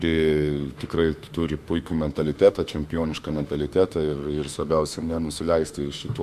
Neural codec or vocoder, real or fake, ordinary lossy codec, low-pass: autoencoder, 48 kHz, 32 numbers a frame, DAC-VAE, trained on Japanese speech; fake; MP3, 96 kbps; 14.4 kHz